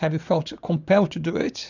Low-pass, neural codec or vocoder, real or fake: 7.2 kHz; vocoder, 44.1 kHz, 80 mel bands, Vocos; fake